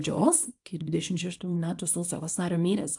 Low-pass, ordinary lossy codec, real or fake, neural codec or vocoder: 10.8 kHz; AAC, 64 kbps; fake; codec, 24 kHz, 0.9 kbps, WavTokenizer, small release